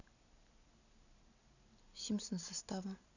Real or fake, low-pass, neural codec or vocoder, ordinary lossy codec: real; 7.2 kHz; none; none